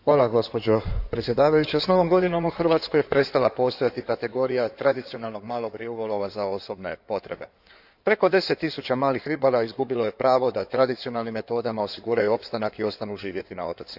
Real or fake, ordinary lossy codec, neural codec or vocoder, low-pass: fake; none; codec, 16 kHz in and 24 kHz out, 2.2 kbps, FireRedTTS-2 codec; 5.4 kHz